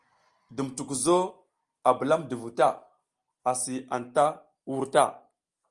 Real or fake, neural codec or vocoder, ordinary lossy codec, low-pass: real; none; Opus, 32 kbps; 10.8 kHz